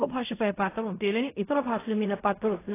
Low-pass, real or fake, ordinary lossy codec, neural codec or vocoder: 3.6 kHz; fake; AAC, 16 kbps; codec, 16 kHz in and 24 kHz out, 0.4 kbps, LongCat-Audio-Codec, fine tuned four codebook decoder